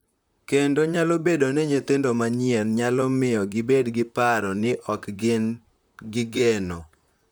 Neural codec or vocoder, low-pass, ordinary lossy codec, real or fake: vocoder, 44.1 kHz, 128 mel bands, Pupu-Vocoder; none; none; fake